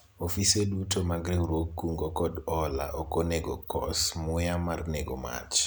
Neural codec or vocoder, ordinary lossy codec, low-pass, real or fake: none; none; none; real